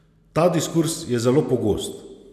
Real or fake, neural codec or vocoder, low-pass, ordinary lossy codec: real; none; 14.4 kHz; none